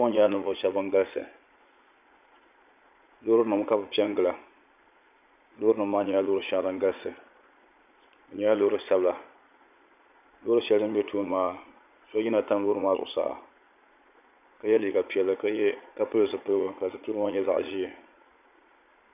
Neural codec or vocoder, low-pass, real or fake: vocoder, 22.05 kHz, 80 mel bands, Vocos; 3.6 kHz; fake